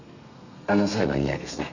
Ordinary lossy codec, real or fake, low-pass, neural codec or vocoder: none; fake; 7.2 kHz; codec, 32 kHz, 1.9 kbps, SNAC